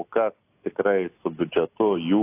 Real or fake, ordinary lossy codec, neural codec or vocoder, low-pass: real; AAC, 24 kbps; none; 3.6 kHz